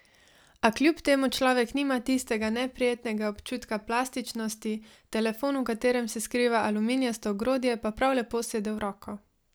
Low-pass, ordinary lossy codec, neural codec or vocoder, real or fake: none; none; none; real